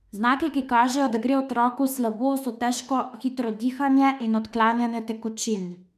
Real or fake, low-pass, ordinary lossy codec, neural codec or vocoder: fake; 14.4 kHz; none; autoencoder, 48 kHz, 32 numbers a frame, DAC-VAE, trained on Japanese speech